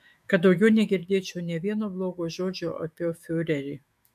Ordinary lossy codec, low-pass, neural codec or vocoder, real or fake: MP3, 64 kbps; 14.4 kHz; autoencoder, 48 kHz, 128 numbers a frame, DAC-VAE, trained on Japanese speech; fake